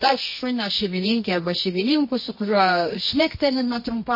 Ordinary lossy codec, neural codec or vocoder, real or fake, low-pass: MP3, 24 kbps; codec, 24 kHz, 0.9 kbps, WavTokenizer, medium music audio release; fake; 5.4 kHz